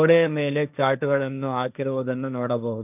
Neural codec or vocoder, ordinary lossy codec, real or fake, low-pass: codec, 16 kHz, 1.1 kbps, Voila-Tokenizer; none; fake; 3.6 kHz